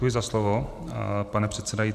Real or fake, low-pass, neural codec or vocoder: fake; 14.4 kHz; vocoder, 44.1 kHz, 128 mel bands every 512 samples, BigVGAN v2